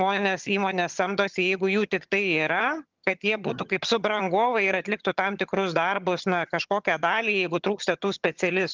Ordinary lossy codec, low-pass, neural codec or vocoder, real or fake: Opus, 32 kbps; 7.2 kHz; vocoder, 22.05 kHz, 80 mel bands, HiFi-GAN; fake